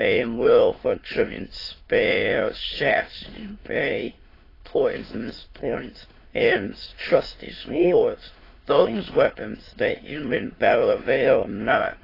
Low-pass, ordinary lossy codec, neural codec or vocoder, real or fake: 5.4 kHz; AAC, 24 kbps; autoencoder, 22.05 kHz, a latent of 192 numbers a frame, VITS, trained on many speakers; fake